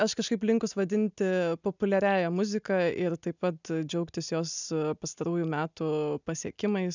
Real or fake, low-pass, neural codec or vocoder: real; 7.2 kHz; none